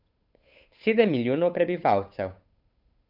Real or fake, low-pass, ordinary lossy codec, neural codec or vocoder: fake; 5.4 kHz; none; codec, 16 kHz, 8 kbps, FunCodec, trained on Chinese and English, 25 frames a second